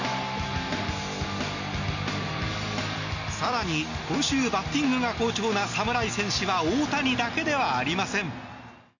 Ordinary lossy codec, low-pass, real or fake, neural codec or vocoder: none; 7.2 kHz; real; none